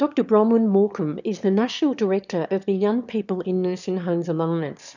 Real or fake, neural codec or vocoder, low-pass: fake; autoencoder, 22.05 kHz, a latent of 192 numbers a frame, VITS, trained on one speaker; 7.2 kHz